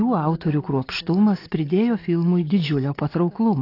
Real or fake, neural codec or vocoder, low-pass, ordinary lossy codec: real; none; 5.4 kHz; AAC, 32 kbps